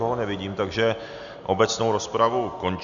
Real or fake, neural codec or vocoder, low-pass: real; none; 7.2 kHz